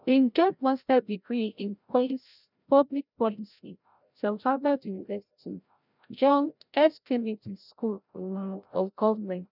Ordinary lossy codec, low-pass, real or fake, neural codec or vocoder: none; 5.4 kHz; fake; codec, 16 kHz, 0.5 kbps, FreqCodec, larger model